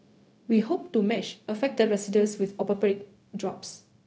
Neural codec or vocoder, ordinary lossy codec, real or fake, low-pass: codec, 16 kHz, 0.4 kbps, LongCat-Audio-Codec; none; fake; none